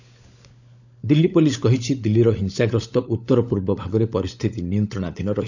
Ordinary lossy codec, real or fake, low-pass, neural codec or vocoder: none; fake; 7.2 kHz; codec, 16 kHz, 16 kbps, FunCodec, trained on LibriTTS, 50 frames a second